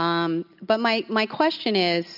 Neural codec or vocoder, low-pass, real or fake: none; 5.4 kHz; real